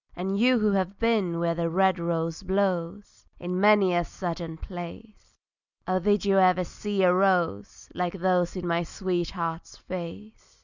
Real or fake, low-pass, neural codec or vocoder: real; 7.2 kHz; none